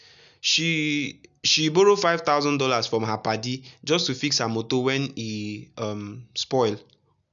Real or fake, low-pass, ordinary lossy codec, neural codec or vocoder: real; 7.2 kHz; none; none